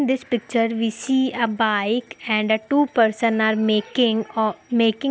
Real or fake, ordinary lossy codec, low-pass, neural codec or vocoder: real; none; none; none